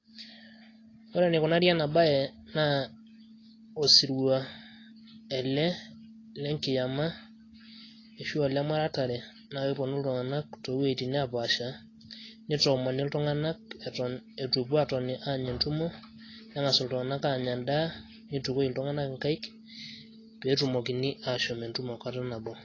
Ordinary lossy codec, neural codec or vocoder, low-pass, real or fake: AAC, 32 kbps; none; 7.2 kHz; real